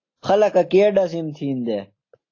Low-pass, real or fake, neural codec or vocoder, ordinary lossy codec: 7.2 kHz; real; none; AAC, 32 kbps